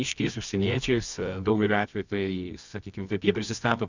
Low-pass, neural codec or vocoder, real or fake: 7.2 kHz; codec, 24 kHz, 0.9 kbps, WavTokenizer, medium music audio release; fake